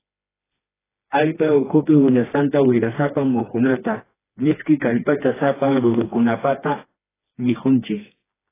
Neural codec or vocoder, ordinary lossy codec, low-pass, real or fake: codec, 16 kHz, 2 kbps, FreqCodec, smaller model; AAC, 16 kbps; 3.6 kHz; fake